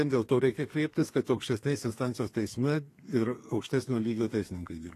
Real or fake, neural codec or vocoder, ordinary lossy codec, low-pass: fake; codec, 32 kHz, 1.9 kbps, SNAC; AAC, 48 kbps; 14.4 kHz